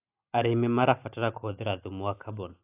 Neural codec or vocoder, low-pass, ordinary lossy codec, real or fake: none; 3.6 kHz; AAC, 32 kbps; real